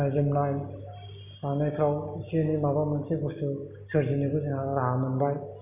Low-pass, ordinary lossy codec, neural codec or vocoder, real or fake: 3.6 kHz; none; none; real